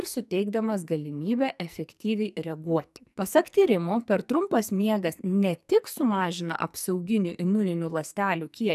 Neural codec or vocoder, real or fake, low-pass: codec, 44.1 kHz, 2.6 kbps, SNAC; fake; 14.4 kHz